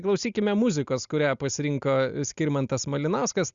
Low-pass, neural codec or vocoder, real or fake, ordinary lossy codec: 7.2 kHz; none; real; Opus, 64 kbps